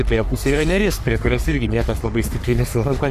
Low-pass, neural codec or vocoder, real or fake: 14.4 kHz; codec, 44.1 kHz, 3.4 kbps, Pupu-Codec; fake